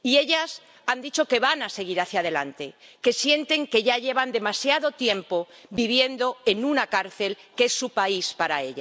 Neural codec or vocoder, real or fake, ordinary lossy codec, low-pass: none; real; none; none